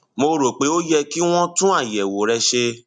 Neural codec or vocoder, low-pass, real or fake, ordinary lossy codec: none; 9.9 kHz; real; none